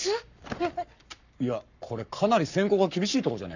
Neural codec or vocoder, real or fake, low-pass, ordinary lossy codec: codec, 44.1 kHz, 7.8 kbps, Pupu-Codec; fake; 7.2 kHz; none